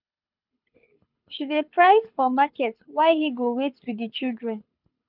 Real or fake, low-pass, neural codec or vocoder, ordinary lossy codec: fake; 5.4 kHz; codec, 24 kHz, 6 kbps, HILCodec; none